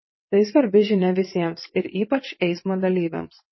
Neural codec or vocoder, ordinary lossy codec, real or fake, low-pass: vocoder, 22.05 kHz, 80 mel bands, WaveNeXt; MP3, 24 kbps; fake; 7.2 kHz